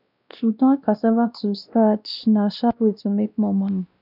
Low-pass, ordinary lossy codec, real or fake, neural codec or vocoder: 5.4 kHz; none; fake; codec, 16 kHz, 1 kbps, X-Codec, WavLM features, trained on Multilingual LibriSpeech